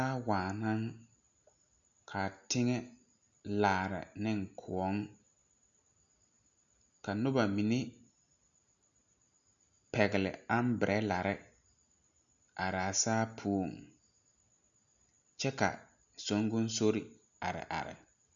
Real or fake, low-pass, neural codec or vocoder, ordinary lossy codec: real; 7.2 kHz; none; MP3, 96 kbps